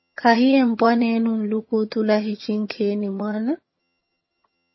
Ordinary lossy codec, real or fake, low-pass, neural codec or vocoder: MP3, 24 kbps; fake; 7.2 kHz; vocoder, 22.05 kHz, 80 mel bands, HiFi-GAN